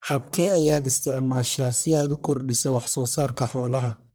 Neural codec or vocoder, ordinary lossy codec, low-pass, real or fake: codec, 44.1 kHz, 1.7 kbps, Pupu-Codec; none; none; fake